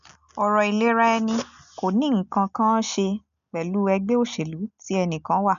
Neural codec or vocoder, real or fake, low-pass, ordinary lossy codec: none; real; 7.2 kHz; MP3, 96 kbps